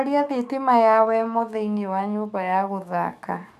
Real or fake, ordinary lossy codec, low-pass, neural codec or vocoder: fake; none; 14.4 kHz; codec, 44.1 kHz, 7.8 kbps, DAC